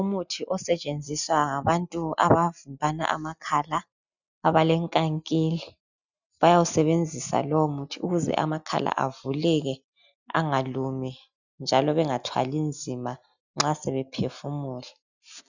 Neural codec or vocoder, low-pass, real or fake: none; 7.2 kHz; real